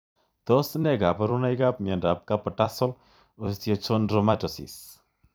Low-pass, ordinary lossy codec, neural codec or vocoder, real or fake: none; none; vocoder, 44.1 kHz, 128 mel bands every 256 samples, BigVGAN v2; fake